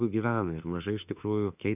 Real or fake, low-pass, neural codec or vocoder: fake; 3.6 kHz; autoencoder, 48 kHz, 32 numbers a frame, DAC-VAE, trained on Japanese speech